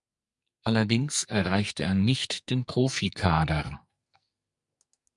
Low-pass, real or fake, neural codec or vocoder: 10.8 kHz; fake; codec, 44.1 kHz, 2.6 kbps, SNAC